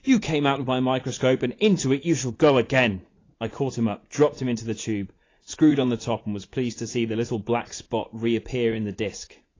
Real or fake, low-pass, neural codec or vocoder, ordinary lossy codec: fake; 7.2 kHz; vocoder, 44.1 kHz, 80 mel bands, Vocos; AAC, 32 kbps